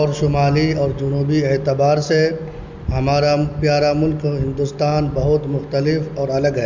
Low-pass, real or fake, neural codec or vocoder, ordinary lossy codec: 7.2 kHz; real; none; MP3, 64 kbps